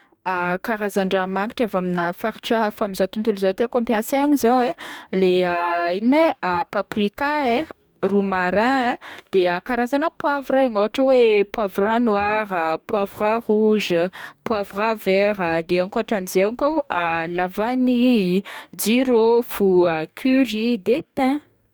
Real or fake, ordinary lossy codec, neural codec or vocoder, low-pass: fake; none; codec, 44.1 kHz, 2.6 kbps, DAC; none